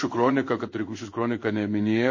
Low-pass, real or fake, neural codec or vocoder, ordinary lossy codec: 7.2 kHz; fake; codec, 16 kHz in and 24 kHz out, 1 kbps, XY-Tokenizer; MP3, 32 kbps